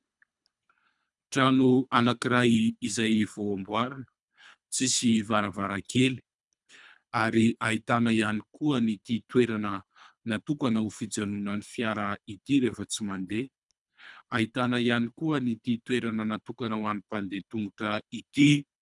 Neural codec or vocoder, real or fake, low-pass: codec, 24 kHz, 3 kbps, HILCodec; fake; 10.8 kHz